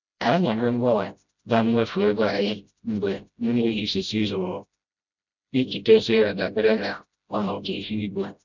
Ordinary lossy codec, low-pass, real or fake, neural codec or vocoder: none; 7.2 kHz; fake; codec, 16 kHz, 0.5 kbps, FreqCodec, smaller model